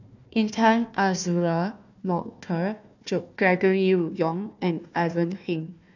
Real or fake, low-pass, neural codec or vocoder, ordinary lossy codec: fake; 7.2 kHz; codec, 16 kHz, 1 kbps, FunCodec, trained on Chinese and English, 50 frames a second; none